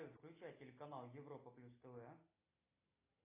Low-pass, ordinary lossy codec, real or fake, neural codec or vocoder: 3.6 kHz; AAC, 24 kbps; real; none